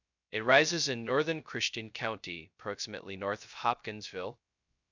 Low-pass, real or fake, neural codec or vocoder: 7.2 kHz; fake; codec, 16 kHz, 0.2 kbps, FocalCodec